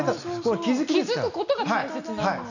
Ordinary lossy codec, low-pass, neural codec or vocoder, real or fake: none; 7.2 kHz; none; real